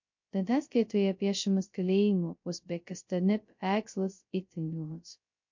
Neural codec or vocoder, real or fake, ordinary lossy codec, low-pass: codec, 16 kHz, 0.2 kbps, FocalCodec; fake; MP3, 48 kbps; 7.2 kHz